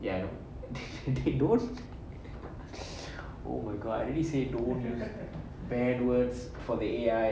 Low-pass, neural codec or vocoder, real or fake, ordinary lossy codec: none; none; real; none